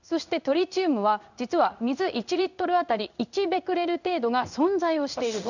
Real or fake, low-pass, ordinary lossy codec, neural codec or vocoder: fake; 7.2 kHz; none; codec, 16 kHz in and 24 kHz out, 1 kbps, XY-Tokenizer